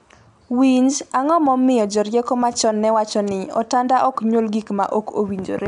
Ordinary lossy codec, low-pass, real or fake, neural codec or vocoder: none; 10.8 kHz; real; none